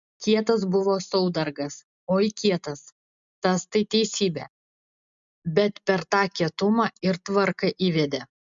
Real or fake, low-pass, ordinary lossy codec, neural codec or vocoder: real; 7.2 kHz; MP3, 64 kbps; none